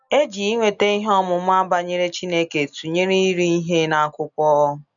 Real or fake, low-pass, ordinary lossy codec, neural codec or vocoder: real; 7.2 kHz; none; none